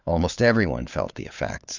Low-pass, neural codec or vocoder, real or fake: 7.2 kHz; codec, 16 kHz, 4 kbps, FunCodec, trained on LibriTTS, 50 frames a second; fake